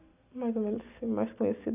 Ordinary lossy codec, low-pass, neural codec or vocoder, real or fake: none; 3.6 kHz; none; real